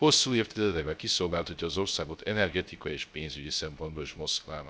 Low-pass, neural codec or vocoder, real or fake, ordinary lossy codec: none; codec, 16 kHz, 0.3 kbps, FocalCodec; fake; none